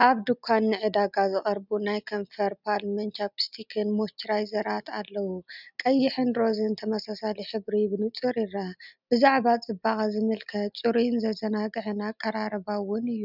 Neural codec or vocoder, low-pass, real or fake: none; 5.4 kHz; real